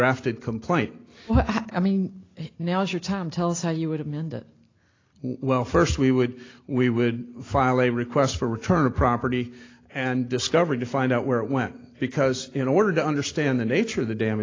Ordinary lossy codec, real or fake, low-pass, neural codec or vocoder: AAC, 32 kbps; real; 7.2 kHz; none